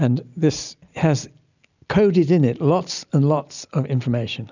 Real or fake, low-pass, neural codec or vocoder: real; 7.2 kHz; none